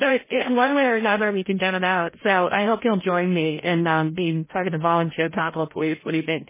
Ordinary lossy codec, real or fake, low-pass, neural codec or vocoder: MP3, 16 kbps; fake; 3.6 kHz; codec, 16 kHz, 0.5 kbps, FreqCodec, larger model